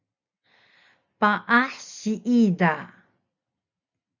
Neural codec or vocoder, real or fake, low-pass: none; real; 7.2 kHz